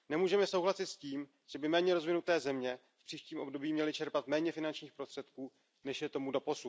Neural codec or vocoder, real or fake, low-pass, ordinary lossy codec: none; real; none; none